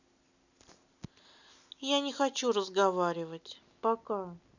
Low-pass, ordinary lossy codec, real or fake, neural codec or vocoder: 7.2 kHz; none; real; none